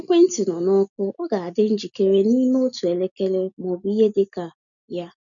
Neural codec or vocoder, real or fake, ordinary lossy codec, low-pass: none; real; none; 7.2 kHz